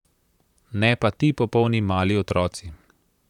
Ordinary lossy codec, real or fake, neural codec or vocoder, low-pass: none; fake; vocoder, 44.1 kHz, 128 mel bands, Pupu-Vocoder; 19.8 kHz